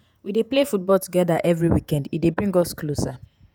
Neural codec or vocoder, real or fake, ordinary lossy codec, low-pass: vocoder, 48 kHz, 128 mel bands, Vocos; fake; none; none